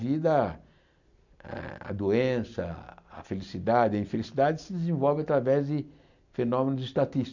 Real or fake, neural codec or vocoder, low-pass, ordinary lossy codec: real; none; 7.2 kHz; none